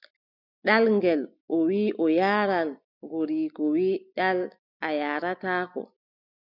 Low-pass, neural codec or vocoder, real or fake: 5.4 kHz; none; real